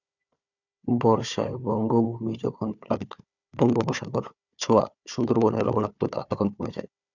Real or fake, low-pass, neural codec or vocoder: fake; 7.2 kHz; codec, 16 kHz, 4 kbps, FunCodec, trained on Chinese and English, 50 frames a second